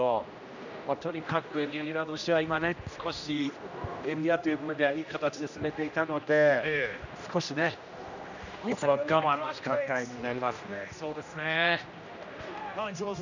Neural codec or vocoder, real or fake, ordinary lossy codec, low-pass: codec, 16 kHz, 1 kbps, X-Codec, HuBERT features, trained on general audio; fake; none; 7.2 kHz